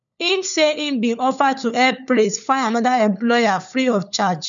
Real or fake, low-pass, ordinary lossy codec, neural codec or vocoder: fake; 7.2 kHz; none; codec, 16 kHz, 4 kbps, FunCodec, trained on LibriTTS, 50 frames a second